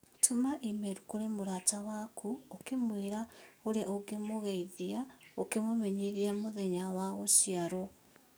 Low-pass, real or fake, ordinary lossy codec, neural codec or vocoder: none; fake; none; codec, 44.1 kHz, 7.8 kbps, DAC